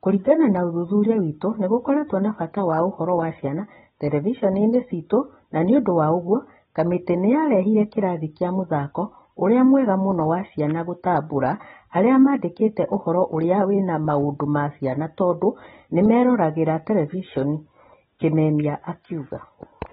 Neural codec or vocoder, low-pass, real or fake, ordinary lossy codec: none; 7.2 kHz; real; AAC, 16 kbps